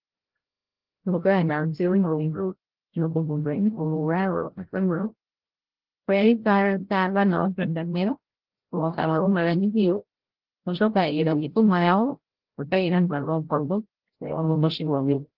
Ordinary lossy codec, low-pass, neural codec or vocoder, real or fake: Opus, 16 kbps; 5.4 kHz; codec, 16 kHz, 0.5 kbps, FreqCodec, larger model; fake